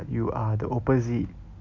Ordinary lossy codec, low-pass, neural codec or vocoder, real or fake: none; 7.2 kHz; none; real